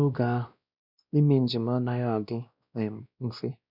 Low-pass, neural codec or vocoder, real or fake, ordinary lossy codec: 5.4 kHz; codec, 16 kHz, 1 kbps, X-Codec, WavLM features, trained on Multilingual LibriSpeech; fake; none